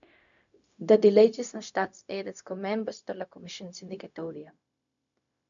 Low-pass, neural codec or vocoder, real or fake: 7.2 kHz; codec, 16 kHz, 0.4 kbps, LongCat-Audio-Codec; fake